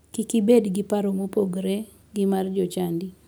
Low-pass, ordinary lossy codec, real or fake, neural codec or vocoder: none; none; real; none